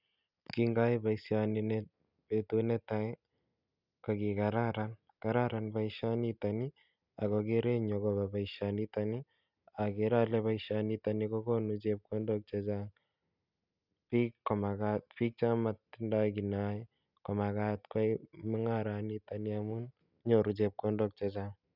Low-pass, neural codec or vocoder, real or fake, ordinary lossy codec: 5.4 kHz; none; real; none